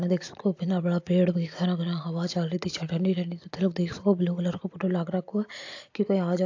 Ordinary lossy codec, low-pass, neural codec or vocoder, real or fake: AAC, 48 kbps; 7.2 kHz; none; real